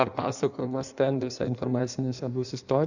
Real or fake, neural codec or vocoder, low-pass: fake; codec, 16 kHz in and 24 kHz out, 1.1 kbps, FireRedTTS-2 codec; 7.2 kHz